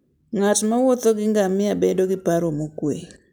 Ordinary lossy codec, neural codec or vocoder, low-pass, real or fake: none; none; none; real